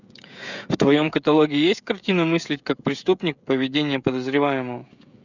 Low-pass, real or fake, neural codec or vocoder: 7.2 kHz; fake; codec, 16 kHz, 16 kbps, FreqCodec, smaller model